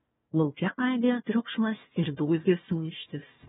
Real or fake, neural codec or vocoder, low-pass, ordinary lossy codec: fake; codec, 16 kHz, 1 kbps, FunCodec, trained on Chinese and English, 50 frames a second; 7.2 kHz; AAC, 16 kbps